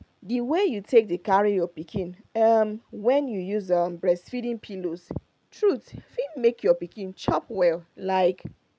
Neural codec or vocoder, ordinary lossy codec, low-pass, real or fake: none; none; none; real